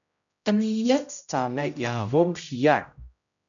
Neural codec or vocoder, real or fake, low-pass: codec, 16 kHz, 0.5 kbps, X-Codec, HuBERT features, trained on general audio; fake; 7.2 kHz